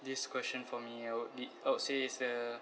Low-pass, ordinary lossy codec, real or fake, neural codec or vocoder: none; none; real; none